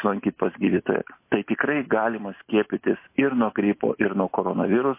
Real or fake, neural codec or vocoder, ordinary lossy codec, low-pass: real; none; MP3, 24 kbps; 3.6 kHz